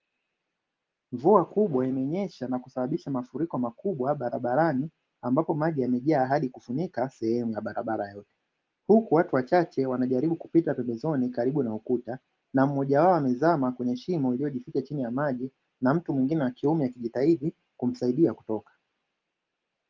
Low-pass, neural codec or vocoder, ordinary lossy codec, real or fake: 7.2 kHz; none; Opus, 32 kbps; real